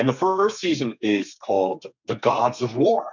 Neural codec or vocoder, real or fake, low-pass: codec, 32 kHz, 1.9 kbps, SNAC; fake; 7.2 kHz